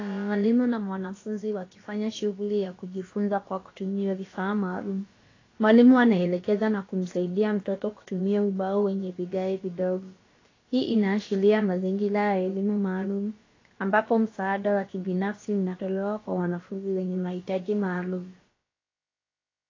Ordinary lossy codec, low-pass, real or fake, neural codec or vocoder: AAC, 32 kbps; 7.2 kHz; fake; codec, 16 kHz, about 1 kbps, DyCAST, with the encoder's durations